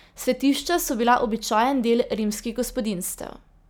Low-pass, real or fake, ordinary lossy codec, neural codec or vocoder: none; real; none; none